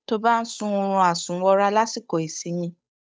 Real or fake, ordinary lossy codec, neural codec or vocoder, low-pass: fake; none; codec, 16 kHz, 8 kbps, FunCodec, trained on Chinese and English, 25 frames a second; none